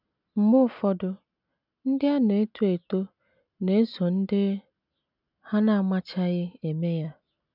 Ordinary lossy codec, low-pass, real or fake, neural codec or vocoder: none; 5.4 kHz; real; none